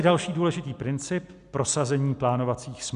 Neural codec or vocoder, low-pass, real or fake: none; 10.8 kHz; real